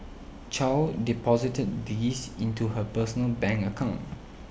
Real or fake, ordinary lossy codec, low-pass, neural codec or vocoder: real; none; none; none